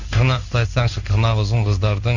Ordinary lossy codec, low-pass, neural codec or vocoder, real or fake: none; 7.2 kHz; codec, 16 kHz in and 24 kHz out, 1 kbps, XY-Tokenizer; fake